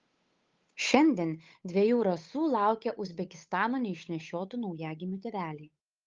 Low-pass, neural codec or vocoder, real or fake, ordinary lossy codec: 7.2 kHz; codec, 16 kHz, 8 kbps, FunCodec, trained on Chinese and English, 25 frames a second; fake; Opus, 32 kbps